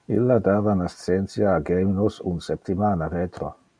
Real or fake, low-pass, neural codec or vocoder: real; 9.9 kHz; none